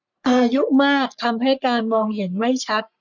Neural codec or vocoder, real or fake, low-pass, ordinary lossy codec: codec, 44.1 kHz, 3.4 kbps, Pupu-Codec; fake; 7.2 kHz; none